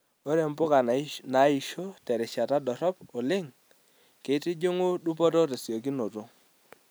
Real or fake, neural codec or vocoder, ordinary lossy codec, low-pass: real; none; none; none